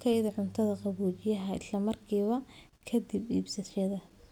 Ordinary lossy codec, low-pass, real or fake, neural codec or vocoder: none; 19.8 kHz; real; none